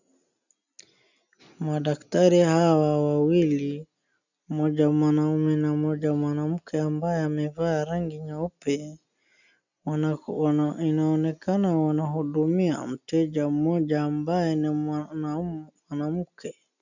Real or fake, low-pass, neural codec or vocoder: real; 7.2 kHz; none